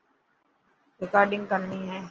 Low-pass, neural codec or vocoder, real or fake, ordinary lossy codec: 7.2 kHz; none; real; Opus, 24 kbps